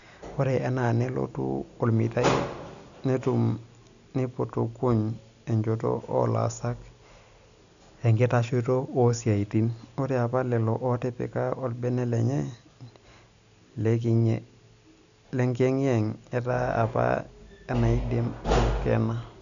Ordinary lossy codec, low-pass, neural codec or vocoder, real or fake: none; 7.2 kHz; none; real